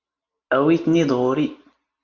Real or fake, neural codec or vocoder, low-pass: real; none; 7.2 kHz